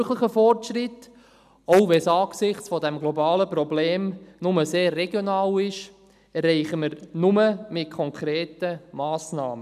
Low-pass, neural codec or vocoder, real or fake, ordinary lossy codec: 14.4 kHz; none; real; none